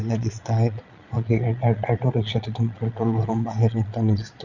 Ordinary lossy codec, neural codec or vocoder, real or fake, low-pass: none; vocoder, 22.05 kHz, 80 mel bands, Vocos; fake; 7.2 kHz